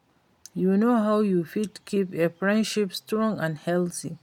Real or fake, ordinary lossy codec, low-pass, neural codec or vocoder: real; none; 19.8 kHz; none